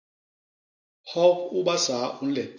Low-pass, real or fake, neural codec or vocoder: 7.2 kHz; real; none